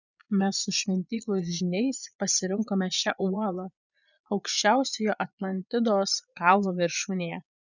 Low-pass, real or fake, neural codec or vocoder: 7.2 kHz; fake; vocoder, 22.05 kHz, 80 mel bands, Vocos